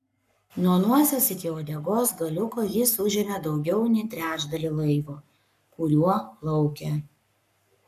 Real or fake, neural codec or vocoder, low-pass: fake; codec, 44.1 kHz, 7.8 kbps, Pupu-Codec; 14.4 kHz